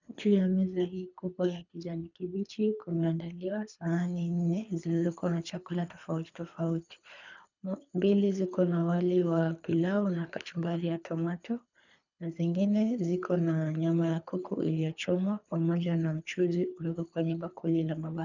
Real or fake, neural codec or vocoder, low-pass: fake; codec, 24 kHz, 3 kbps, HILCodec; 7.2 kHz